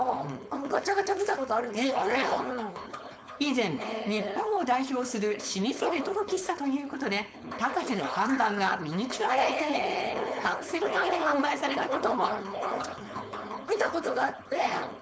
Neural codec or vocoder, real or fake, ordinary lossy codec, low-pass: codec, 16 kHz, 4.8 kbps, FACodec; fake; none; none